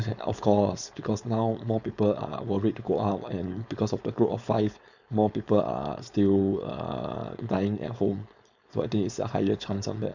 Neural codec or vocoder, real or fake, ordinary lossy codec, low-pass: codec, 16 kHz, 4.8 kbps, FACodec; fake; none; 7.2 kHz